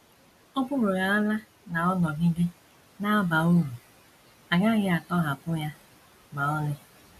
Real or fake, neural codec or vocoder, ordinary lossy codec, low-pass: real; none; none; 14.4 kHz